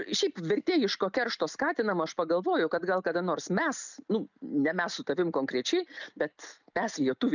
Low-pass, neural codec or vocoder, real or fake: 7.2 kHz; none; real